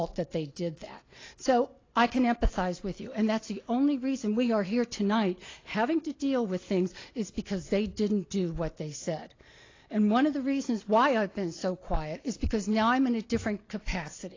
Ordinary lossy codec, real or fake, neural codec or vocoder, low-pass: AAC, 32 kbps; real; none; 7.2 kHz